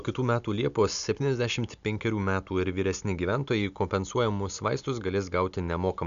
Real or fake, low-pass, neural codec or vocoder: real; 7.2 kHz; none